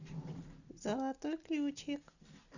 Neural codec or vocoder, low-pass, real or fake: none; 7.2 kHz; real